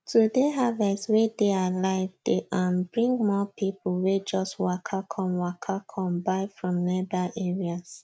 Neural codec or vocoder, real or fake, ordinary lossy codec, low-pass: none; real; none; none